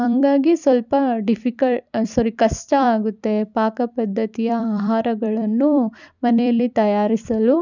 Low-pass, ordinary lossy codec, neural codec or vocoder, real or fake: 7.2 kHz; none; vocoder, 44.1 kHz, 128 mel bands every 256 samples, BigVGAN v2; fake